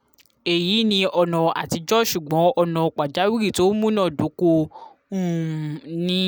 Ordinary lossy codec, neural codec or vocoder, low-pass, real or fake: none; none; none; real